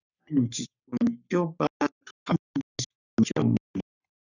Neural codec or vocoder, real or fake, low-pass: codec, 44.1 kHz, 3.4 kbps, Pupu-Codec; fake; 7.2 kHz